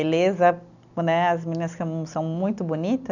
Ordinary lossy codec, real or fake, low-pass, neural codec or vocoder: none; real; 7.2 kHz; none